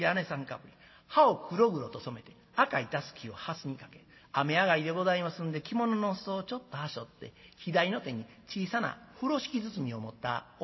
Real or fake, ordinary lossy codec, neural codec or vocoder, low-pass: real; MP3, 24 kbps; none; 7.2 kHz